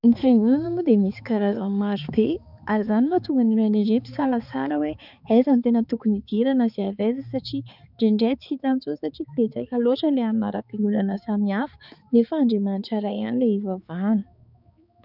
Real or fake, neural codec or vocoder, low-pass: fake; codec, 16 kHz, 4 kbps, X-Codec, HuBERT features, trained on balanced general audio; 5.4 kHz